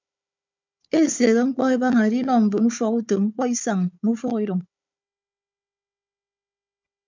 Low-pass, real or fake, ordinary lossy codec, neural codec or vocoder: 7.2 kHz; fake; MP3, 64 kbps; codec, 16 kHz, 4 kbps, FunCodec, trained on Chinese and English, 50 frames a second